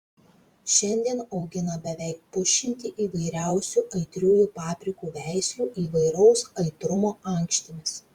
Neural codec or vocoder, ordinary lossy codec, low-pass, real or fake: vocoder, 44.1 kHz, 128 mel bands every 512 samples, BigVGAN v2; Opus, 64 kbps; 19.8 kHz; fake